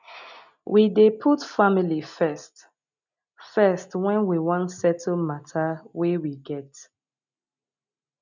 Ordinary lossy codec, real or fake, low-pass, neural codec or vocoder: none; real; 7.2 kHz; none